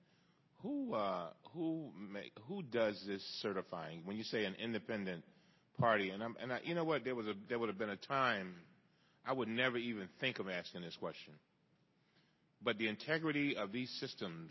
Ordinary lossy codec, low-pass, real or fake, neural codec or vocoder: MP3, 24 kbps; 7.2 kHz; real; none